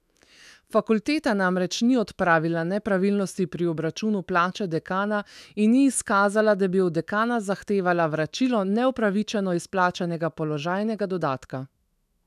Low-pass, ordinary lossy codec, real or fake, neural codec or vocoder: 14.4 kHz; none; fake; autoencoder, 48 kHz, 128 numbers a frame, DAC-VAE, trained on Japanese speech